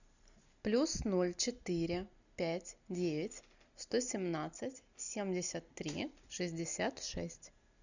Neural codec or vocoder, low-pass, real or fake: none; 7.2 kHz; real